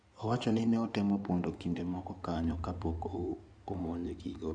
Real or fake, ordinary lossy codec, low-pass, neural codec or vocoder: fake; none; 9.9 kHz; codec, 16 kHz in and 24 kHz out, 2.2 kbps, FireRedTTS-2 codec